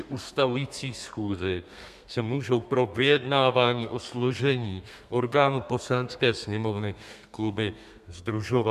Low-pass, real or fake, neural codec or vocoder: 14.4 kHz; fake; codec, 32 kHz, 1.9 kbps, SNAC